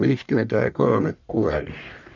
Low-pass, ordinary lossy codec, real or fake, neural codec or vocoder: 7.2 kHz; none; fake; codec, 44.1 kHz, 1.7 kbps, Pupu-Codec